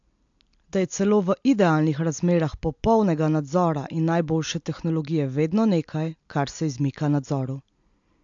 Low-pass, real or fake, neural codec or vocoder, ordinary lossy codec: 7.2 kHz; real; none; AAC, 64 kbps